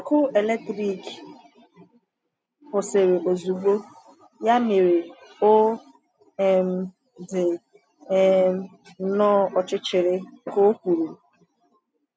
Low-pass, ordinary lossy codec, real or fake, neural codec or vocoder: none; none; real; none